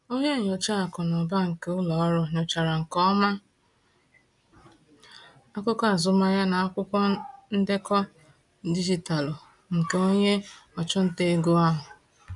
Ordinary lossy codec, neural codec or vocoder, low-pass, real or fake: none; none; 10.8 kHz; real